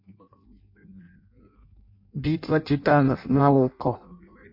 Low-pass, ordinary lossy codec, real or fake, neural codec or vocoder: 5.4 kHz; MP3, 32 kbps; fake; codec, 16 kHz in and 24 kHz out, 0.6 kbps, FireRedTTS-2 codec